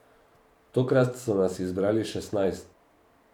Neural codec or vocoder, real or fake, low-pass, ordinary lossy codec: vocoder, 44.1 kHz, 128 mel bands every 512 samples, BigVGAN v2; fake; 19.8 kHz; none